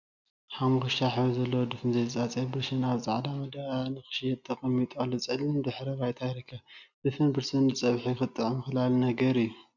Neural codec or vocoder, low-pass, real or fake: none; 7.2 kHz; real